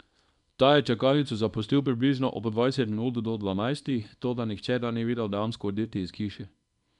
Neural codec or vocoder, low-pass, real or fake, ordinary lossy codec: codec, 24 kHz, 0.9 kbps, WavTokenizer, small release; 10.8 kHz; fake; none